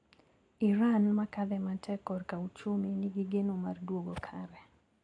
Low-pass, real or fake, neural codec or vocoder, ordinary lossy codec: 9.9 kHz; real; none; AAC, 48 kbps